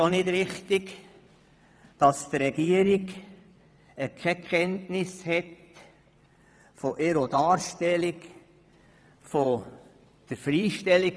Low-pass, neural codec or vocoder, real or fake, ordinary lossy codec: none; vocoder, 22.05 kHz, 80 mel bands, WaveNeXt; fake; none